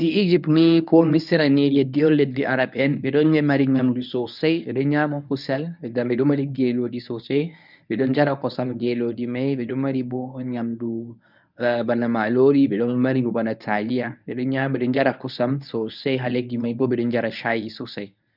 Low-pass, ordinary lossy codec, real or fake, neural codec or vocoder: 5.4 kHz; none; fake; codec, 24 kHz, 0.9 kbps, WavTokenizer, medium speech release version 1